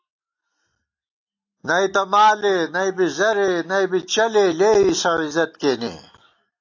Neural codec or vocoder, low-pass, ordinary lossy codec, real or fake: none; 7.2 kHz; AAC, 48 kbps; real